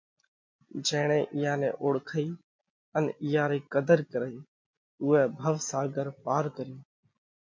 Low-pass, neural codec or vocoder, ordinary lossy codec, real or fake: 7.2 kHz; none; MP3, 48 kbps; real